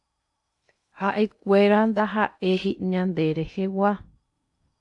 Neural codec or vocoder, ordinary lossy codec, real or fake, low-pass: codec, 16 kHz in and 24 kHz out, 0.8 kbps, FocalCodec, streaming, 65536 codes; AAC, 64 kbps; fake; 10.8 kHz